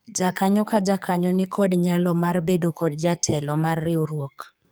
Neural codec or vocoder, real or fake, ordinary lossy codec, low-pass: codec, 44.1 kHz, 2.6 kbps, SNAC; fake; none; none